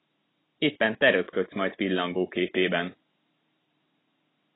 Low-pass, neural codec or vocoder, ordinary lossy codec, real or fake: 7.2 kHz; vocoder, 44.1 kHz, 128 mel bands every 512 samples, BigVGAN v2; AAC, 16 kbps; fake